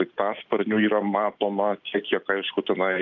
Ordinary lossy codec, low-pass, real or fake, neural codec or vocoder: Opus, 32 kbps; 7.2 kHz; real; none